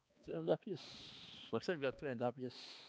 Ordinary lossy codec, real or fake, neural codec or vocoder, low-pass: none; fake; codec, 16 kHz, 2 kbps, X-Codec, HuBERT features, trained on balanced general audio; none